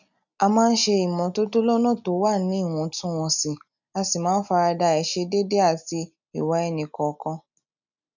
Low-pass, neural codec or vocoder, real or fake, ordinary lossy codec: 7.2 kHz; none; real; none